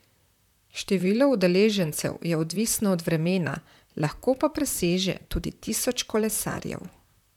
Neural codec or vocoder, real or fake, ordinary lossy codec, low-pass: vocoder, 44.1 kHz, 128 mel bands, Pupu-Vocoder; fake; none; 19.8 kHz